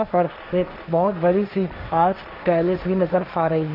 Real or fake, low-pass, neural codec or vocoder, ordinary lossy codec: fake; 5.4 kHz; codec, 16 kHz, 1.1 kbps, Voila-Tokenizer; AAC, 48 kbps